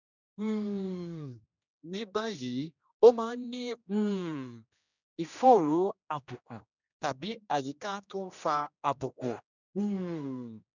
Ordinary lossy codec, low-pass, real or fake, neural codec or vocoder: none; 7.2 kHz; fake; codec, 16 kHz, 1 kbps, X-Codec, HuBERT features, trained on general audio